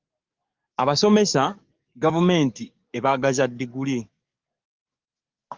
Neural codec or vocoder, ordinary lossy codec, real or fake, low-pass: codec, 44.1 kHz, 7.8 kbps, DAC; Opus, 32 kbps; fake; 7.2 kHz